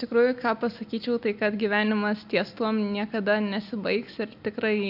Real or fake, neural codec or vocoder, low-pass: real; none; 5.4 kHz